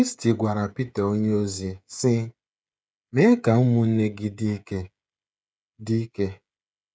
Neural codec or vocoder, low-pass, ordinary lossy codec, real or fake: codec, 16 kHz, 16 kbps, FreqCodec, smaller model; none; none; fake